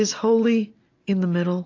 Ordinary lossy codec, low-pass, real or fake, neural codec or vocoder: AAC, 32 kbps; 7.2 kHz; real; none